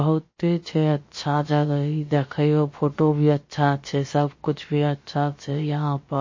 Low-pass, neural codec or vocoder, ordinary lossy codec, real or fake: 7.2 kHz; codec, 16 kHz, about 1 kbps, DyCAST, with the encoder's durations; MP3, 32 kbps; fake